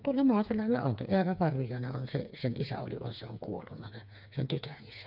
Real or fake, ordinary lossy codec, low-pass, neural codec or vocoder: fake; none; 5.4 kHz; codec, 16 kHz, 4 kbps, FreqCodec, smaller model